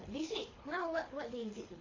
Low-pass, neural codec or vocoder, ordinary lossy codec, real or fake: 7.2 kHz; codec, 24 kHz, 6 kbps, HILCodec; AAC, 32 kbps; fake